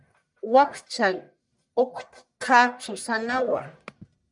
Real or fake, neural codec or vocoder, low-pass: fake; codec, 44.1 kHz, 1.7 kbps, Pupu-Codec; 10.8 kHz